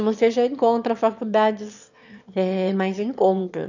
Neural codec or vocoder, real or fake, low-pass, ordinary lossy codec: autoencoder, 22.05 kHz, a latent of 192 numbers a frame, VITS, trained on one speaker; fake; 7.2 kHz; none